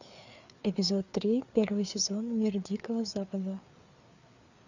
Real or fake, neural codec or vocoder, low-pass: fake; codec, 16 kHz, 4 kbps, FreqCodec, larger model; 7.2 kHz